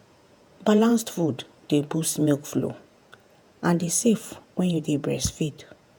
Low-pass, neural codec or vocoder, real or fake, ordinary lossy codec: none; vocoder, 48 kHz, 128 mel bands, Vocos; fake; none